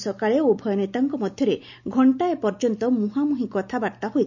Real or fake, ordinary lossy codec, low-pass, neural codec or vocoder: real; none; 7.2 kHz; none